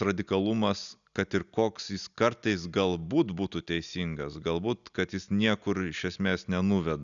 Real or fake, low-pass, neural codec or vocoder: real; 7.2 kHz; none